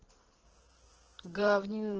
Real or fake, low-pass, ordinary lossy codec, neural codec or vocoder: fake; 7.2 kHz; Opus, 16 kbps; codec, 16 kHz in and 24 kHz out, 2.2 kbps, FireRedTTS-2 codec